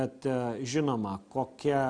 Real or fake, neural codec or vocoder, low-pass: real; none; 9.9 kHz